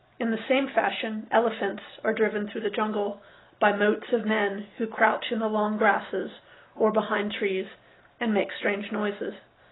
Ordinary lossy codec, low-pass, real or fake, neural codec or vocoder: AAC, 16 kbps; 7.2 kHz; real; none